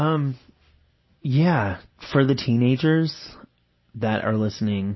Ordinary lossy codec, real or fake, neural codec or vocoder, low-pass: MP3, 24 kbps; real; none; 7.2 kHz